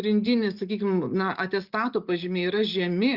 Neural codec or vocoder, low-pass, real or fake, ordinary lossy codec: none; 5.4 kHz; real; Opus, 64 kbps